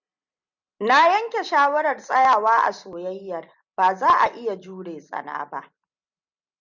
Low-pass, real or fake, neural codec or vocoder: 7.2 kHz; real; none